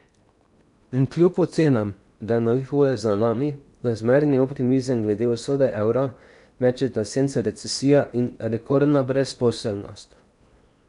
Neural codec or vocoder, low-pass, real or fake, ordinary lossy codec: codec, 16 kHz in and 24 kHz out, 0.8 kbps, FocalCodec, streaming, 65536 codes; 10.8 kHz; fake; none